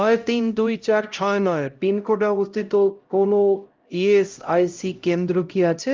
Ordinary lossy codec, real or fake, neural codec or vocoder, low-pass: Opus, 32 kbps; fake; codec, 16 kHz, 0.5 kbps, X-Codec, HuBERT features, trained on LibriSpeech; 7.2 kHz